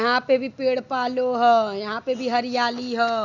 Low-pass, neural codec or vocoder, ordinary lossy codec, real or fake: 7.2 kHz; none; none; real